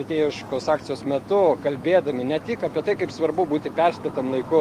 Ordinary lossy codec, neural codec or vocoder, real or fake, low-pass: Opus, 24 kbps; autoencoder, 48 kHz, 128 numbers a frame, DAC-VAE, trained on Japanese speech; fake; 14.4 kHz